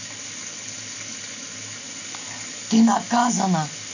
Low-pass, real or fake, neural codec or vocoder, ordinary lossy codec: 7.2 kHz; real; none; none